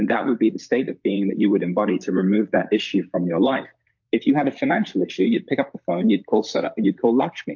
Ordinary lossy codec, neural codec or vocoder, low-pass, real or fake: MP3, 48 kbps; vocoder, 44.1 kHz, 128 mel bands, Pupu-Vocoder; 7.2 kHz; fake